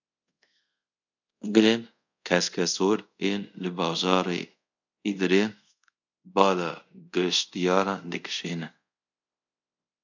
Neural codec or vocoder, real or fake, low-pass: codec, 24 kHz, 0.5 kbps, DualCodec; fake; 7.2 kHz